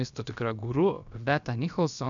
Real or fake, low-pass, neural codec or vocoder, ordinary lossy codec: fake; 7.2 kHz; codec, 16 kHz, about 1 kbps, DyCAST, with the encoder's durations; MP3, 96 kbps